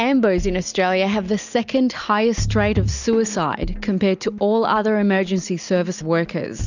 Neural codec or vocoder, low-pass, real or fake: none; 7.2 kHz; real